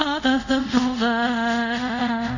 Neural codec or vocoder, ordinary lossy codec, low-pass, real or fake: codec, 24 kHz, 0.5 kbps, DualCodec; none; 7.2 kHz; fake